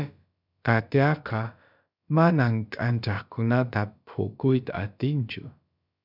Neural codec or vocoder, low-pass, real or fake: codec, 16 kHz, about 1 kbps, DyCAST, with the encoder's durations; 5.4 kHz; fake